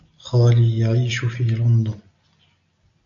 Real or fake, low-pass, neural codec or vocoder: real; 7.2 kHz; none